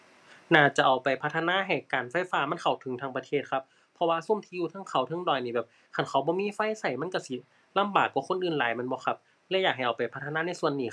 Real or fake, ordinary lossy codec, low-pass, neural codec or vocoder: real; none; none; none